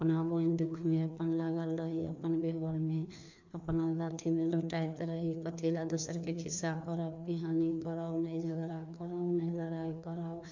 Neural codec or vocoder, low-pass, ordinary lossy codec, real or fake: codec, 16 kHz, 2 kbps, FreqCodec, larger model; 7.2 kHz; MP3, 64 kbps; fake